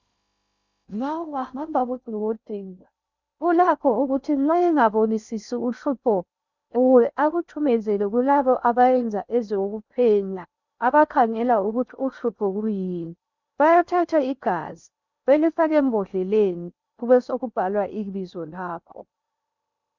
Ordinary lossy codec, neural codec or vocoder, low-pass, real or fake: Opus, 64 kbps; codec, 16 kHz in and 24 kHz out, 0.6 kbps, FocalCodec, streaming, 2048 codes; 7.2 kHz; fake